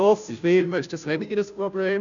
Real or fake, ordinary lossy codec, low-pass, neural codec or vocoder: fake; none; 7.2 kHz; codec, 16 kHz, 0.5 kbps, FunCodec, trained on Chinese and English, 25 frames a second